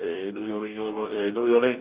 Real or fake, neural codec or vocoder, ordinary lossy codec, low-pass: fake; codec, 44.1 kHz, 2.6 kbps, DAC; Opus, 64 kbps; 3.6 kHz